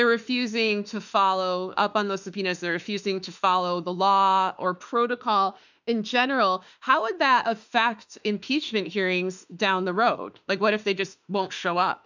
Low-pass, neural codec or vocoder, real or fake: 7.2 kHz; autoencoder, 48 kHz, 32 numbers a frame, DAC-VAE, trained on Japanese speech; fake